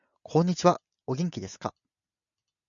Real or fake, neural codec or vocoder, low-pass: real; none; 7.2 kHz